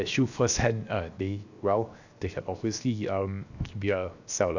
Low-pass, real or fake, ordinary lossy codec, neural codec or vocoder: 7.2 kHz; fake; none; codec, 16 kHz, 0.7 kbps, FocalCodec